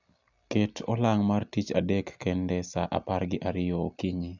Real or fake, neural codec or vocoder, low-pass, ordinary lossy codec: real; none; 7.2 kHz; none